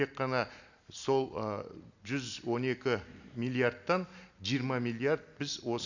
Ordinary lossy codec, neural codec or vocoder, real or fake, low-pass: none; none; real; 7.2 kHz